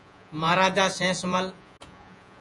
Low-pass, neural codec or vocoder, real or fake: 10.8 kHz; vocoder, 48 kHz, 128 mel bands, Vocos; fake